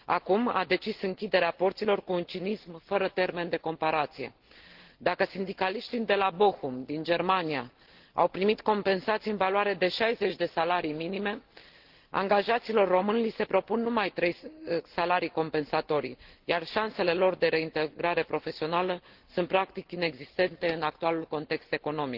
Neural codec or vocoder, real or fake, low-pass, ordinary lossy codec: none; real; 5.4 kHz; Opus, 16 kbps